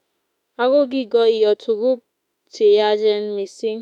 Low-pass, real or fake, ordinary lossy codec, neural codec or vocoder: 19.8 kHz; fake; none; autoencoder, 48 kHz, 32 numbers a frame, DAC-VAE, trained on Japanese speech